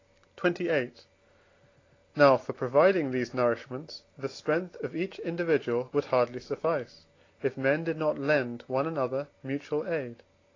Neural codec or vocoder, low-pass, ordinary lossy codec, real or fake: none; 7.2 kHz; AAC, 32 kbps; real